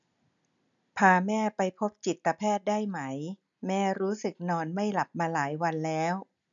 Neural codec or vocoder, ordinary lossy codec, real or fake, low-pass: none; none; real; 7.2 kHz